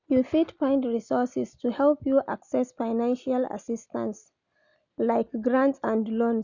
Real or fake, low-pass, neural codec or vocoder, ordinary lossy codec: real; 7.2 kHz; none; none